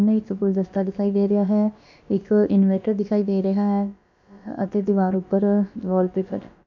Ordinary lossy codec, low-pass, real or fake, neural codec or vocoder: MP3, 64 kbps; 7.2 kHz; fake; codec, 16 kHz, about 1 kbps, DyCAST, with the encoder's durations